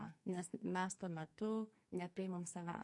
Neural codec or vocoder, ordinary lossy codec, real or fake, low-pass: codec, 44.1 kHz, 2.6 kbps, SNAC; MP3, 48 kbps; fake; 10.8 kHz